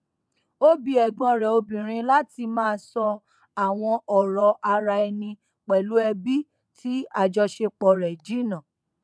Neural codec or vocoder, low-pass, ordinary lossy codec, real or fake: vocoder, 22.05 kHz, 80 mel bands, WaveNeXt; none; none; fake